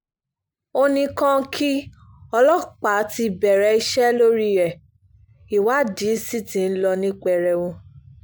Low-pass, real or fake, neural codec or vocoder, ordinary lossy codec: none; real; none; none